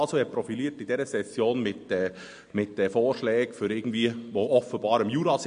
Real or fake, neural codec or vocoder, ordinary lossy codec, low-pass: real; none; MP3, 48 kbps; 9.9 kHz